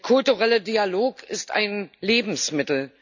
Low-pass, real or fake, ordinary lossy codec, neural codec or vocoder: 7.2 kHz; real; none; none